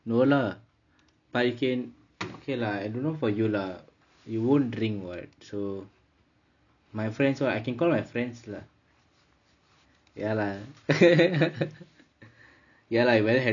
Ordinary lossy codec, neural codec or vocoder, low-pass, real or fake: none; none; 7.2 kHz; real